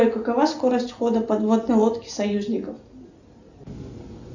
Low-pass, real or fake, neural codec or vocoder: 7.2 kHz; real; none